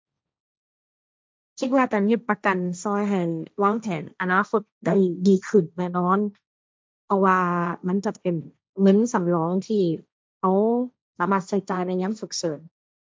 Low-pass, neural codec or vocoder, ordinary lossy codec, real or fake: none; codec, 16 kHz, 1.1 kbps, Voila-Tokenizer; none; fake